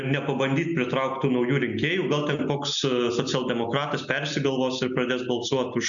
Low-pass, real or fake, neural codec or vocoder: 7.2 kHz; real; none